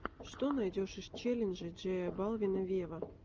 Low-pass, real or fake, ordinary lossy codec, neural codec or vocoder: 7.2 kHz; real; Opus, 24 kbps; none